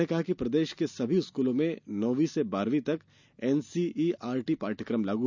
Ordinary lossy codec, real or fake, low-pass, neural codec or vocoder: none; real; 7.2 kHz; none